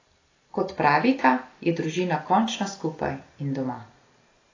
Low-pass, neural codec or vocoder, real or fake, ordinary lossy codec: 7.2 kHz; none; real; AAC, 32 kbps